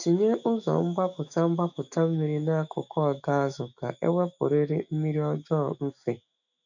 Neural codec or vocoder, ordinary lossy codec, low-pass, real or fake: autoencoder, 48 kHz, 128 numbers a frame, DAC-VAE, trained on Japanese speech; none; 7.2 kHz; fake